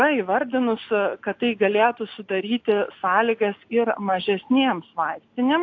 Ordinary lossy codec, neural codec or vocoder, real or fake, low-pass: AAC, 48 kbps; none; real; 7.2 kHz